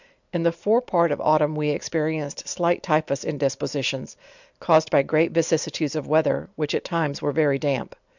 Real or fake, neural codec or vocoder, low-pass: real; none; 7.2 kHz